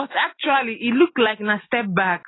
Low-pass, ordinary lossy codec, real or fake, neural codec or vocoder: 7.2 kHz; AAC, 16 kbps; real; none